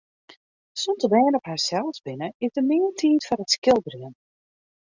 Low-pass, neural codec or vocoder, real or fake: 7.2 kHz; none; real